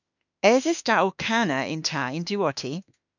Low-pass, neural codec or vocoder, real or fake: 7.2 kHz; autoencoder, 48 kHz, 32 numbers a frame, DAC-VAE, trained on Japanese speech; fake